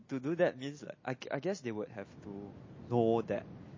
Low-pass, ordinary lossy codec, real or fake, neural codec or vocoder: 7.2 kHz; MP3, 32 kbps; real; none